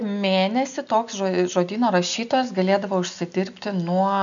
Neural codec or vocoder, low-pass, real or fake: none; 7.2 kHz; real